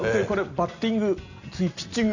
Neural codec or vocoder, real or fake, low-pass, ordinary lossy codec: none; real; 7.2 kHz; none